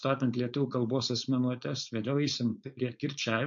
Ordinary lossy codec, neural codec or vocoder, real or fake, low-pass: MP3, 48 kbps; codec, 16 kHz, 4.8 kbps, FACodec; fake; 7.2 kHz